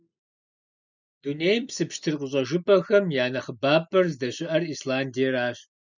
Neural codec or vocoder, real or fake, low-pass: none; real; 7.2 kHz